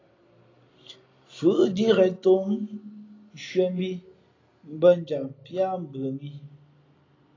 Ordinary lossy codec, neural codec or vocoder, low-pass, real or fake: AAC, 32 kbps; none; 7.2 kHz; real